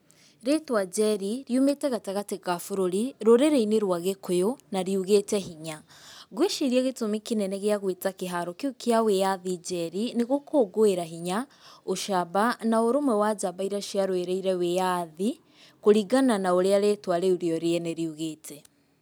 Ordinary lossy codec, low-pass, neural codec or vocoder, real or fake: none; none; none; real